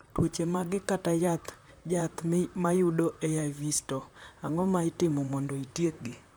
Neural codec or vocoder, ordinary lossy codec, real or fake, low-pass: vocoder, 44.1 kHz, 128 mel bands, Pupu-Vocoder; none; fake; none